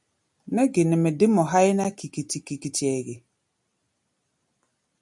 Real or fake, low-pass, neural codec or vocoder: real; 10.8 kHz; none